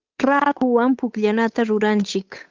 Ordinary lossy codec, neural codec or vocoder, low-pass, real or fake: Opus, 24 kbps; codec, 16 kHz, 8 kbps, FunCodec, trained on Chinese and English, 25 frames a second; 7.2 kHz; fake